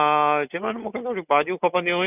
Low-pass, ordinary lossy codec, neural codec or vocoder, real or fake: 3.6 kHz; none; none; real